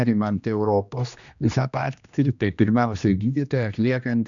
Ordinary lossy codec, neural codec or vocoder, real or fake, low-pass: MP3, 96 kbps; codec, 16 kHz, 1 kbps, X-Codec, HuBERT features, trained on general audio; fake; 7.2 kHz